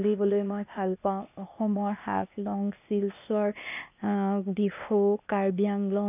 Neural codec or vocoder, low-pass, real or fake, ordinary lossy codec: codec, 16 kHz, 0.8 kbps, ZipCodec; 3.6 kHz; fake; none